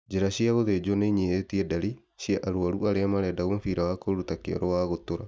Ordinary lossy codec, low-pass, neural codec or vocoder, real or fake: none; none; none; real